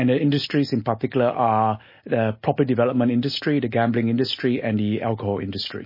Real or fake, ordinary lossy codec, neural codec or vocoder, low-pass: real; MP3, 24 kbps; none; 5.4 kHz